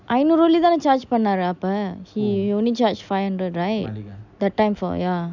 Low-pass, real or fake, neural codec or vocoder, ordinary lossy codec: 7.2 kHz; real; none; none